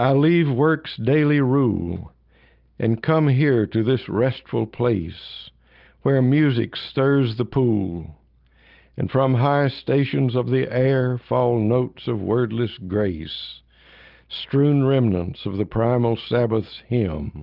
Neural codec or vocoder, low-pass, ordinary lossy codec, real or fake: none; 5.4 kHz; Opus, 24 kbps; real